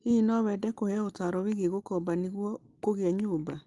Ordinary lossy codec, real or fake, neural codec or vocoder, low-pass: Opus, 24 kbps; real; none; 10.8 kHz